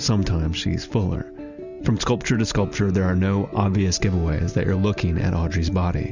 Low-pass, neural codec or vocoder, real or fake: 7.2 kHz; none; real